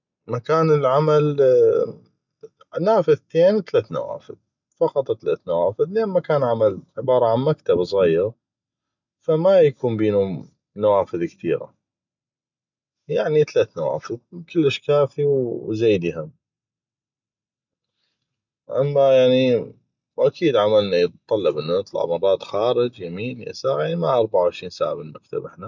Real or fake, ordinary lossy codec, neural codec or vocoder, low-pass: real; none; none; 7.2 kHz